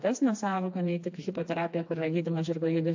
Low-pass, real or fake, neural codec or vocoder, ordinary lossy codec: 7.2 kHz; fake; codec, 16 kHz, 2 kbps, FreqCodec, smaller model; AAC, 48 kbps